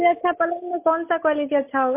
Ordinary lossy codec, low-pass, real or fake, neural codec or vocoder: MP3, 24 kbps; 3.6 kHz; real; none